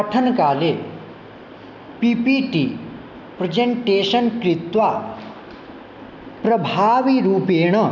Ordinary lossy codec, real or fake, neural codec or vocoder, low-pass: none; real; none; 7.2 kHz